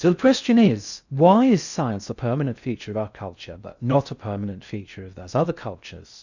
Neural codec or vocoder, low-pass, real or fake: codec, 16 kHz in and 24 kHz out, 0.6 kbps, FocalCodec, streaming, 4096 codes; 7.2 kHz; fake